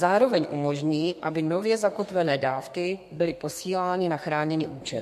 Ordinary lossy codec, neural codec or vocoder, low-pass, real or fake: MP3, 64 kbps; codec, 32 kHz, 1.9 kbps, SNAC; 14.4 kHz; fake